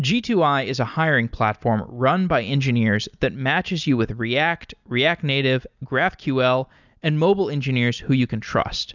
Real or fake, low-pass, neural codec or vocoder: real; 7.2 kHz; none